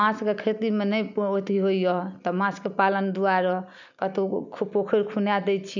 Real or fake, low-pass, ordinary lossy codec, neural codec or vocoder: real; 7.2 kHz; none; none